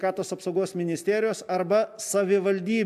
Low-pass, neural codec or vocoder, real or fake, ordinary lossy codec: 14.4 kHz; none; real; AAC, 96 kbps